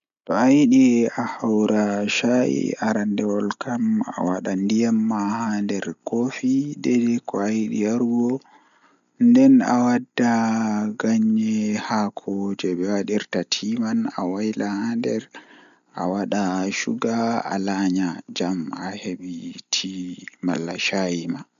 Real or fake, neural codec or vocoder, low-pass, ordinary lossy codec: real; none; 7.2 kHz; none